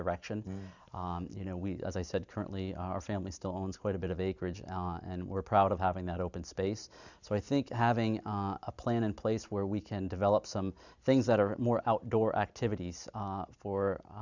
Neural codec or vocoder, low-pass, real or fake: none; 7.2 kHz; real